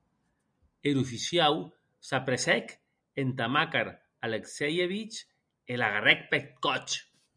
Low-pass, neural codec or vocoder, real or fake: 9.9 kHz; none; real